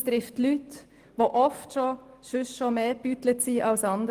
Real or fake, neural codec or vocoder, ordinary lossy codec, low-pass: real; none; Opus, 24 kbps; 14.4 kHz